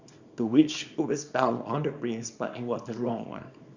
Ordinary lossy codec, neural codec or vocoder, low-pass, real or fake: Opus, 64 kbps; codec, 24 kHz, 0.9 kbps, WavTokenizer, small release; 7.2 kHz; fake